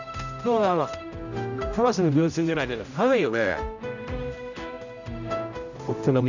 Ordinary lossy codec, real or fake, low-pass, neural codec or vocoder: none; fake; 7.2 kHz; codec, 16 kHz, 0.5 kbps, X-Codec, HuBERT features, trained on general audio